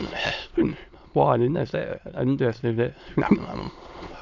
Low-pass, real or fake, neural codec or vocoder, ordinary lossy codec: 7.2 kHz; fake; autoencoder, 22.05 kHz, a latent of 192 numbers a frame, VITS, trained on many speakers; none